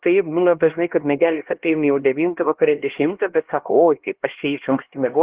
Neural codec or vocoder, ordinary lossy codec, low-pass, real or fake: codec, 16 kHz, 1 kbps, X-Codec, HuBERT features, trained on LibriSpeech; Opus, 16 kbps; 3.6 kHz; fake